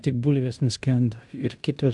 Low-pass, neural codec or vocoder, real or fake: 10.8 kHz; codec, 16 kHz in and 24 kHz out, 0.9 kbps, LongCat-Audio-Codec, fine tuned four codebook decoder; fake